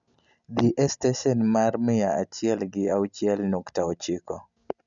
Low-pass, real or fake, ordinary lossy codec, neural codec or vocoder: 7.2 kHz; real; none; none